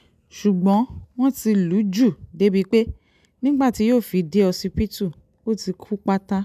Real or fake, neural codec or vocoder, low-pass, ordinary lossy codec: real; none; 14.4 kHz; none